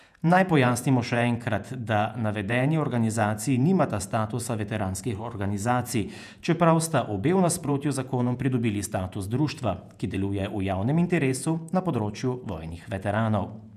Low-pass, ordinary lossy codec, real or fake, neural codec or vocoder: 14.4 kHz; none; fake; vocoder, 48 kHz, 128 mel bands, Vocos